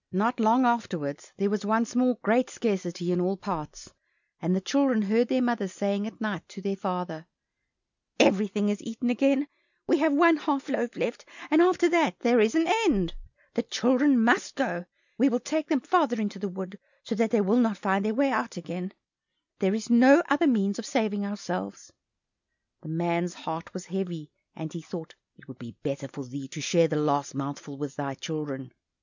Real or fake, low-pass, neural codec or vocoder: real; 7.2 kHz; none